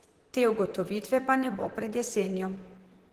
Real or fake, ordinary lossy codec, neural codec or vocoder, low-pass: fake; Opus, 16 kbps; vocoder, 44.1 kHz, 128 mel bands, Pupu-Vocoder; 14.4 kHz